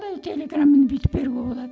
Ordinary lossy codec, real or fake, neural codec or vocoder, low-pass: none; real; none; none